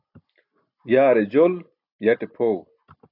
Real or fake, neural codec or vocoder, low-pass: real; none; 5.4 kHz